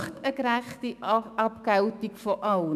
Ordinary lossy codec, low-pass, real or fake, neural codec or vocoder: none; 14.4 kHz; fake; autoencoder, 48 kHz, 128 numbers a frame, DAC-VAE, trained on Japanese speech